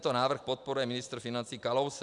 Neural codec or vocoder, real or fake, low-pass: none; real; 10.8 kHz